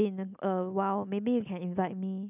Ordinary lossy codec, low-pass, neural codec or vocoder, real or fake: none; 3.6 kHz; none; real